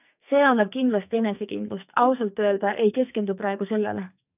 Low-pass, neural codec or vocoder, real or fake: 3.6 kHz; codec, 44.1 kHz, 2.6 kbps, SNAC; fake